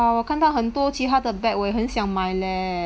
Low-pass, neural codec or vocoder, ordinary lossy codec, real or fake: none; none; none; real